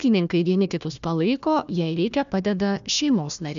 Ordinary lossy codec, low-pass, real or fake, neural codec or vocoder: MP3, 96 kbps; 7.2 kHz; fake; codec, 16 kHz, 1 kbps, FunCodec, trained on Chinese and English, 50 frames a second